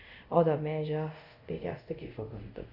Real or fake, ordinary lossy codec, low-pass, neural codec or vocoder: fake; AAC, 48 kbps; 5.4 kHz; codec, 24 kHz, 0.5 kbps, DualCodec